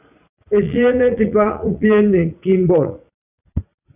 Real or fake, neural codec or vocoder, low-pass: fake; vocoder, 44.1 kHz, 80 mel bands, Vocos; 3.6 kHz